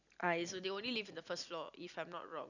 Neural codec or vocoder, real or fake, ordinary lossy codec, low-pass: none; real; none; 7.2 kHz